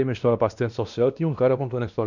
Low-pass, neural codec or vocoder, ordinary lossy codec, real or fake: 7.2 kHz; codec, 16 kHz, 1 kbps, X-Codec, WavLM features, trained on Multilingual LibriSpeech; none; fake